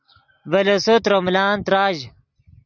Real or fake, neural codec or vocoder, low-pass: real; none; 7.2 kHz